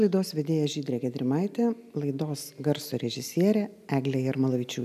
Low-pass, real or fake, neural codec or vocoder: 14.4 kHz; real; none